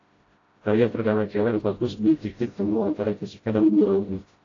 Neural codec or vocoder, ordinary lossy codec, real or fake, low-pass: codec, 16 kHz, 0.5 kbps, FreqCodec, smaller model; AAC, 32 kbps; fake; 7.2 kHz